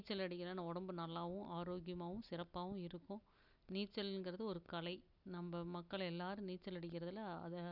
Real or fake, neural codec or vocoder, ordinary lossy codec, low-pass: real; none; none; 5.4 kHz